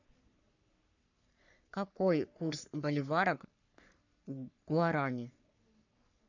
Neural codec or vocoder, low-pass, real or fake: codec, 44.1 kHz, 3.4 kbps, Pupu-Codec; 7.2 kHz; fake